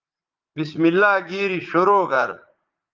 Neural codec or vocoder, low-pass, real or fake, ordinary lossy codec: vocoder, 44.1 kHz, 80 mel bands, Vocos; 7.2 kHz; fake; Opus, 24 kbps